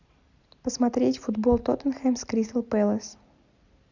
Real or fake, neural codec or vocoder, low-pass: real; none; 7.2 kHz